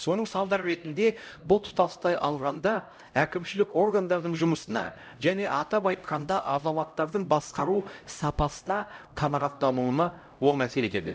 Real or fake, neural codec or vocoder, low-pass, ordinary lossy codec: fake; codec, 16 kHz, 0.5 kbps, X-Codec, HuBERT features, trained on LibriSpeech; none; none